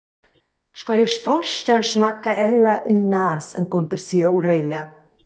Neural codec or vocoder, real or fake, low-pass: codec, 24 kHz, 0.9 kbps, WavTokenizer, medium music audio release; fake; 9.9 kHz